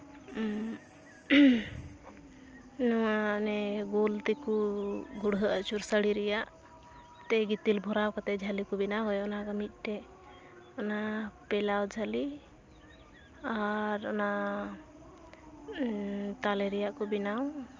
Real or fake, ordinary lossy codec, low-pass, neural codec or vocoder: real; Opus, 24 kbps; 7.2 kHz; none